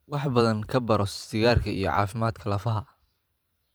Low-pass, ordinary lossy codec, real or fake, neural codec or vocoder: none; none; fake; vocoder, 44.1 kHz, 128 mel bands every 512 samples, BigVGAN v2